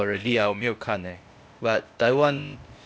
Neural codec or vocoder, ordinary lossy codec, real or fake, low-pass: codec, 16 kHz, 0.8 kbps, ZipCodec; none; fake; none